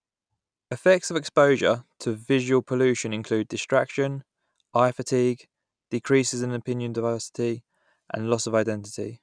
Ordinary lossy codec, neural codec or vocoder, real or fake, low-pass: none; none; real; 9.9 kHz